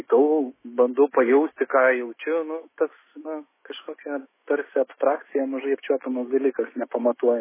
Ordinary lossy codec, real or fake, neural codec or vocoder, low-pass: MP3, 16 kbps; fake; autoencoder, 48 kHz, 128 numbers a frame, DAC-VAE, trained on Japanese speech; 3.6 kHz